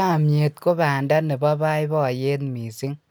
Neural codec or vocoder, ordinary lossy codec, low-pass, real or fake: none; none; none; real